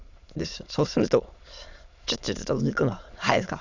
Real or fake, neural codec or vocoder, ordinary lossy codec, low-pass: fake; autoencoder, 22.05 kHz, a latent of 192 numbers a frame, VITS, trained on many speakers; none; 7.2 kHz